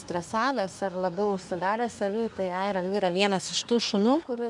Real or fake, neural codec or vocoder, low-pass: fake; codec, 24 kHz, 1 kbps, SNAC; 10.8 kHz